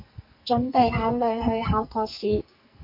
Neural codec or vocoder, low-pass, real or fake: codec, 44.1 kHz, 2.6 kbps, SNAC; 5.4 kHz; fake